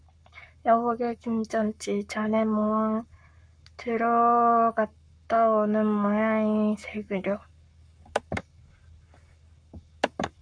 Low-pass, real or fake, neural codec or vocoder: 9.9 kHz; fake; codec, 44.1 kHz, 7.8 kbps, Pupu-Codec